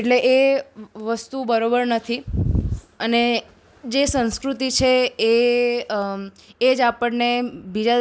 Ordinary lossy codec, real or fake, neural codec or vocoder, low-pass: none; real; none; none